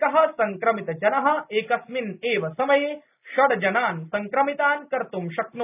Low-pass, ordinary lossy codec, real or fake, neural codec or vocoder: 3.6 kHz; none; real; none